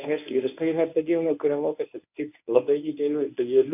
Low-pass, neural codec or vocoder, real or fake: 3.6 kHz; codec, 24 kHz, 0.9 kbps, WavTokenizer, medium speech release version 1; fake